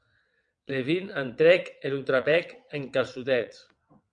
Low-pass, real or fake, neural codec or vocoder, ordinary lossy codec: 9.9 kHz; fake; vocoder, 22.05 kHz, 80 mel bands, WaveNeXt; MP3, 96 kbps